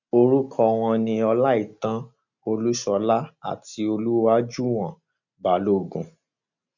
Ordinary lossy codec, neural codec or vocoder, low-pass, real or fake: none; none; 7.2 kHz; real